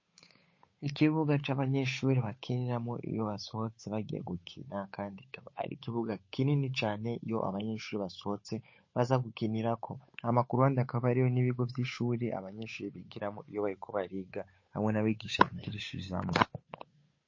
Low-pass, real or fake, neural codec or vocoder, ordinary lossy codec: 7.2 kHz; fake; codec, 16 kHz, 8 kbps, FunCodec, trained on Chinese and English, 25 frames a second; MP3, 32 kbps